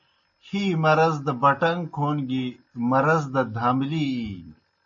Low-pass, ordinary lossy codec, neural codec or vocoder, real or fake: 7.2 kHz; MP3, 32 kbps; none; real